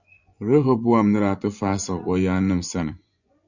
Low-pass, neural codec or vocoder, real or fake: 7.2 kHz; vocoder, 24 kHz, 100 mel bands, Vocos; fake